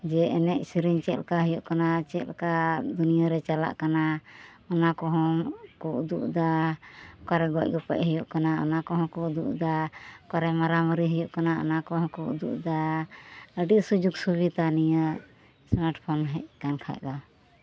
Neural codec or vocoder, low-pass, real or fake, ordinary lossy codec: none; none; real; none